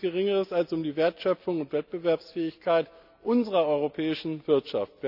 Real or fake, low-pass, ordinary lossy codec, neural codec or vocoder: real; 5.4 kHz; none; none